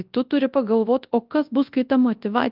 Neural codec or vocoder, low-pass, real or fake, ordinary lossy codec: codec, 24 kHz, 0.9 kbps, WavTokenizer, large speech release; 5.4 kHz; fake; Opus, 24 kbps